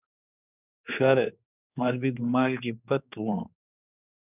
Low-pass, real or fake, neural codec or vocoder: 3.6 kHz; fake; codec, 16 kHz, 2 kbps, X-Codec, HuBERT features, trained on general audio